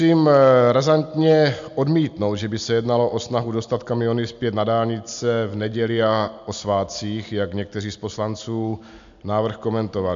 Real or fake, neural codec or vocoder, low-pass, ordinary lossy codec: real; none; 7.2 kHz; MP3, 64 kbps